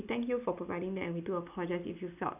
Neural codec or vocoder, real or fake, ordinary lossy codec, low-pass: none; real; none; 3.6 kHz